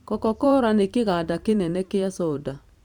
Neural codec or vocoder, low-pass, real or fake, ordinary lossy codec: vocoder, 44.1 kHz, 128 mel bands every 512 samples, BigVGAN v2; 19.8 kHz; fake; Opus, 64 kbps